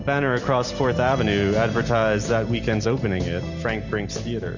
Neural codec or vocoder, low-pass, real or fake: none; 7.2 kHz; real